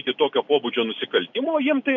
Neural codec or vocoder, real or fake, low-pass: none; real; 7.2 kHz